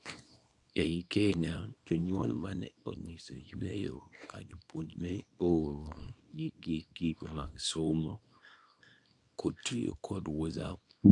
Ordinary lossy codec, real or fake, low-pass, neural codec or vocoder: none; fake; 10.8 kHz; codec, 24 kHz, 0.9 kbps, WavTokenizer, small release